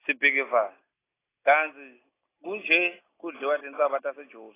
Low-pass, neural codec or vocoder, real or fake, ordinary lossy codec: 3.6 kHz; none; real; AAC, 16 kbps